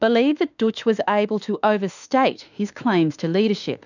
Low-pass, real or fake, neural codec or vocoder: 7.2 kHz; fake; autoencoder, 48 kHz, 32 numbers a frame, DAC-VAE, trained on Japanese speech